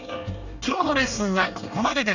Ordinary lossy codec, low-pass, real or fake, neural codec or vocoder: none; 7.2 kHz; fake; codec, 24 kHz, 1 kbps, SNAC